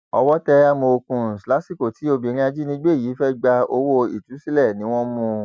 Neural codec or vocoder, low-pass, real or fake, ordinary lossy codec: none; none; real; none